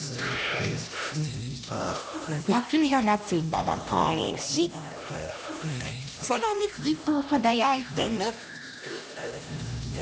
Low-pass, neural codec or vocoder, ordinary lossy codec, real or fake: none; codec, 16 kHz, 1 kbps, X-Codec, HuBERT features, trained on LibriSpeech; none; fake